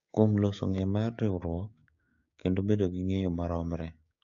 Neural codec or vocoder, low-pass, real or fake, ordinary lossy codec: codec, 16 kHz, 6 kbps, DAC; 7.2 kHz; fake; AAC, 48 kbps